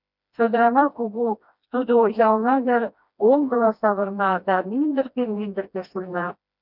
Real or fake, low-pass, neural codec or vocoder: fake; 5.4 kHz; codec, 16 kHz, 1 kbps, FreqCodec, smaller model